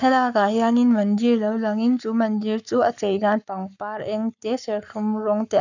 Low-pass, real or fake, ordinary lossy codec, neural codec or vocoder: 7.2 kHz; fake; none; codec, 44.1 kHz, 7.8 kbps, Pupu-Codec